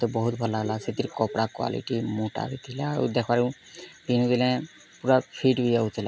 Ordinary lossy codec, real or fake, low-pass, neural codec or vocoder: none; real; none; none